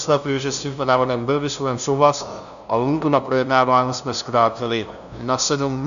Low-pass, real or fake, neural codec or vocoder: 7.2 kHz; fake; codec, 16 kHz, 0.5 kbps, FunCodec, trained on LibriTTS, 25 frames a second